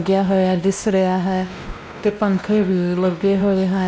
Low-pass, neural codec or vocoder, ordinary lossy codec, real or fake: none; codec, 16 kHz, 1 kbps, X-Codec, WavLM features, trained on Multilingual LibriSpeech; none; fake